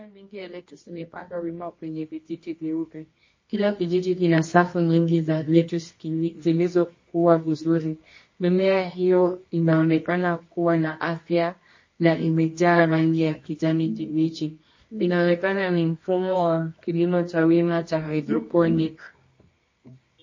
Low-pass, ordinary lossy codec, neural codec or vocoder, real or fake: 7.2 kHz; MP3, 32 kbps; codec, 24 kHz, 0.9 kbps, WavTokenizer, medium music audio release; fake